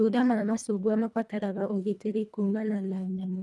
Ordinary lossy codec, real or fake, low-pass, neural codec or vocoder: none; fake; none; codec, 24 kHz, 1.5 kbps, HILCodec